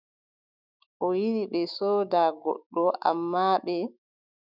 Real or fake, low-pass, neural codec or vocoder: fake; 5.4 kHz; autoencoder, 48 kHz, 128 numbers a frame, DAC-VAE, trained on Japanese speech